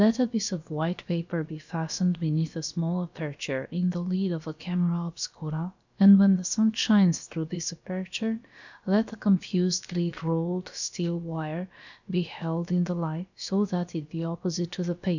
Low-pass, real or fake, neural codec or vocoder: 7.2 kHz; fake; codec, 16 kHz, about 1 kbps, DyCAST, with the encoder's durations